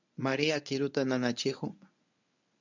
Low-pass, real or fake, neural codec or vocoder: 7.2 kHz; fake; codec, 24 kHz, 0.9 kbps, WavTokenizer, medium speech release version 1